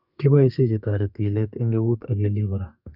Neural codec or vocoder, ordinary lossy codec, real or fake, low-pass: codec, 32 kHz, 1.9 kbps, SNAC; none; fake; 5.4 kHz